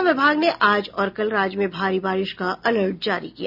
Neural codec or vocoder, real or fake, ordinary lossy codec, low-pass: none; real; none; 5.4 kHz